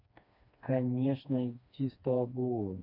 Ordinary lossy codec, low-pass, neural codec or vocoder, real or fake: none; 5.4 kHz; codec, 16 kHz, 2 kbps, FreqCodec, smaller model; fake